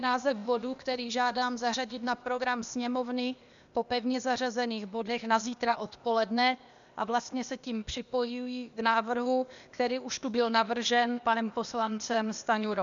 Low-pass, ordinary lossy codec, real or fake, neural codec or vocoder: 7.2 kHz; MP3, 96 kbps; fake; codec, 16 kHz, 0.8 kbps, ZipCodec